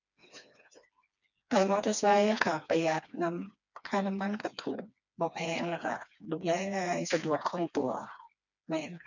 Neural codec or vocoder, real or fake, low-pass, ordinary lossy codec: codec, 16 kHz, 2 kbps, FreqCodec, smaller model; fake; 7.2 kHz; none